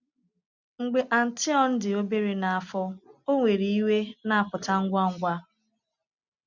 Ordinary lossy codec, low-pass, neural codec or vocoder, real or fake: none; 7.2 kHz; none; real